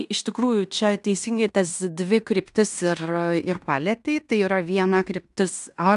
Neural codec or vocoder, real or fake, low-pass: codec, 16 kHz in and 24 kHz out, 0.9 kbps, LongCat-Audio-Codec, fine tuned four codebook decoder; fake; 10.8 kHz